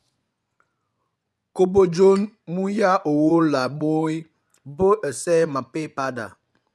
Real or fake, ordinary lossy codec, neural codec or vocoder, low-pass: fake; none; vocoder, 24 kHz, 100 mel bands, Vocos; none